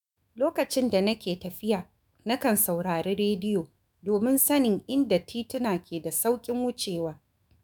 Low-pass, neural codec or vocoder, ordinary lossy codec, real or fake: none; autoencoder, 48 kHz, 128 numbers a frame, DAC-VAE, trained on Japanese speech; none; fake